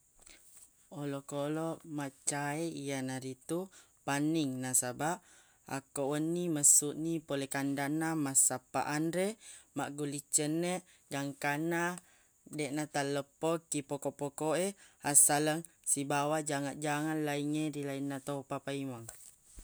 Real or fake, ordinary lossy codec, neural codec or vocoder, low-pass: real; none; none; none